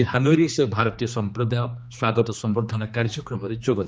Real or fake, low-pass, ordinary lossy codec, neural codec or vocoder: fake; none; none; codec, 16 kHz, 2 kbps, X-Codec, HuBERT features, trained on general audio